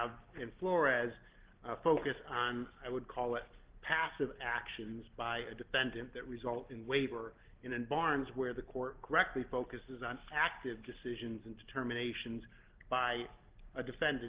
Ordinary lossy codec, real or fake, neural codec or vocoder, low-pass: Opus, 16 kbps; real; none; 3.6 kHz